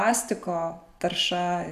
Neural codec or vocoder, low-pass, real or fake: none; 14.4 kHz; real